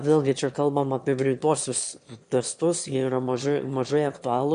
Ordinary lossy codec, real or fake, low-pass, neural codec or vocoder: MP3, 64 kbps; fake; 9.9 kHz; autoencoder, 22.05 kHz, a latent of 192 numbers a frame, VITS, trained on one speaker